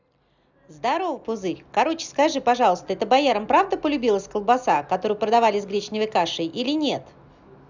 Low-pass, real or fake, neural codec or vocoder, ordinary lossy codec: 7.2 kHz; real; none; none